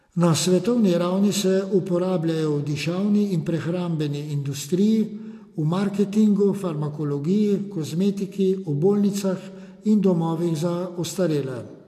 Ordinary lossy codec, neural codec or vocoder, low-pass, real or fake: AAC, 64 kbps; none; 14.4 kHz; real